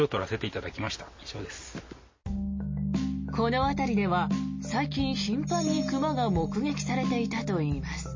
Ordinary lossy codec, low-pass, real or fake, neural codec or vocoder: MP3, 32 kbps; 7.2 kHz; real; none